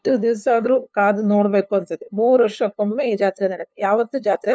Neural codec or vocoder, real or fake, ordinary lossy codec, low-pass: codec, 16 kHz, 2 kbps, FunCodec, trained on LibriTTS, 25 frames a second; fake; none; none